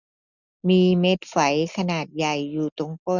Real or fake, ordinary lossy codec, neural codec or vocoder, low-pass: real; none; none; 7.2 kHz